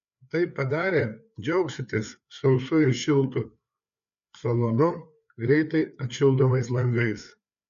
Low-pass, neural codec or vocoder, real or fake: 7.2 kHz; codec, 16 kHz, 4 kbps, FreqCodec, larger model; fake